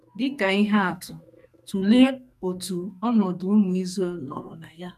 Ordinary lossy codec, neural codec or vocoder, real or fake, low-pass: none; codec, 44.1 kHz, 2.6 kbps, SNAC; fake; 14.4 kHz